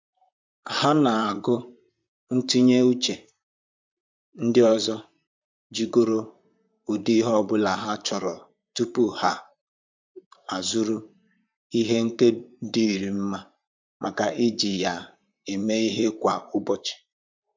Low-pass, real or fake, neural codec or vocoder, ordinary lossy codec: 7.2 kHz; fake; vocoder, 44.1 kHz, 128 mel bands, Pupu-Vocoder; MP3, 64 kbps